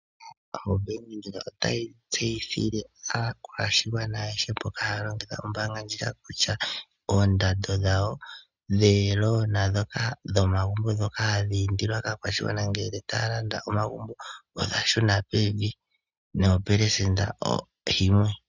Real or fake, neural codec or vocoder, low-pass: real; none; 7.2 kHz